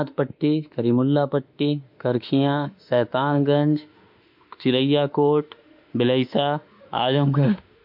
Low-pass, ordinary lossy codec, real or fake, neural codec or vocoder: 5.4 kHz; MP3, 48 kbps; fake; autoencoder, 48 kHz, 32 numbers a frame, DAC-VAE, trained on Japanese speech